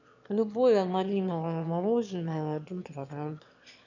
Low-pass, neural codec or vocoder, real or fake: 7.2 kHz; autoencoder, 22.05 kHz, a latent of 192 numbers a frame, VITS, trained on one speaker; fake